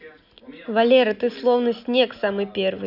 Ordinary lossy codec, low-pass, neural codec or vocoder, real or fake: none; 5.4 kHz; none; real